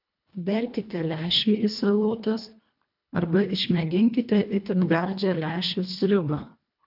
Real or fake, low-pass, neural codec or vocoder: fake; 5.4 kHz; codec, 24 kHz, 1.5 kbps, HILCodec